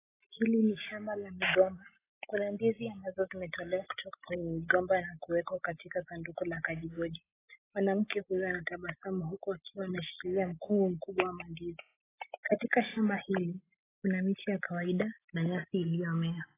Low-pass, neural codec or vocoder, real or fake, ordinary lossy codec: 3.6 kHz; none; real; AAC, 16 kbps